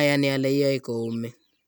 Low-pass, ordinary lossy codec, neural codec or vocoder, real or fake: none; none; none; real